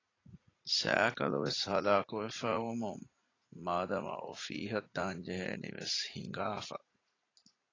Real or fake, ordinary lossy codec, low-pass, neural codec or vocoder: fake; AAC, 32 kbps; 7.2 kHz; vocoder, 44.1 kHz, 80 mel bands, Vocos